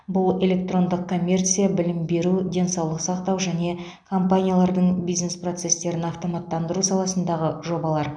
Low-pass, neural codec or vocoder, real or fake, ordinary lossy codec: 9.9 kHz; none; real; none